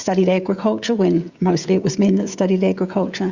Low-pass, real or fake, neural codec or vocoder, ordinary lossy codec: 7.2 kHz; fake; codec, 16 kHz, 16 kbps, FreqCodec, smaller model; Opus, 64 kbps